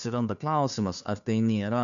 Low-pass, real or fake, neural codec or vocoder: 7.2 kHz; fake; codec, 16 kHz, 2 kbps, FunCodec, trained on Chinese and English, 25 frames a second